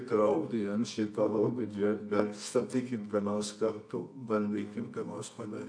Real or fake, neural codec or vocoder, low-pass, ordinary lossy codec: fake; codec, 24 kHz, 0.9 kbps, WavTokenizer, medium music audio release; 9.9 kHz; AAC, 64 kbps